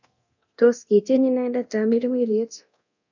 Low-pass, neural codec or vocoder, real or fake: 7.2 kHz; codec, 24 kHz, 0.9 kbps, DualCodec; fake